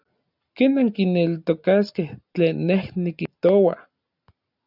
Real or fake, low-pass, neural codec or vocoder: real; 5.4 kHz; none